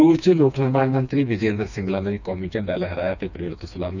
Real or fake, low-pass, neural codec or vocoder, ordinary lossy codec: fake; 7.2 kHz; codec, 16 kHz, 2 kbps, FreqCodec, smaller model; none